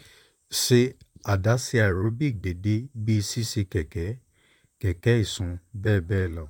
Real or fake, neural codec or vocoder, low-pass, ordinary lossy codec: fake; vocoder, 44.1 kHz, 128 mel bands, Pupu-Vocoder; 19.8 kHz; none